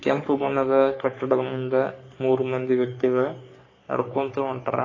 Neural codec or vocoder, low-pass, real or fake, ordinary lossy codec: codec, 44.1 kHz, 3.4 kbps, Pupu-Codec; 7.2 kHz; fake; AAC, 32 kbps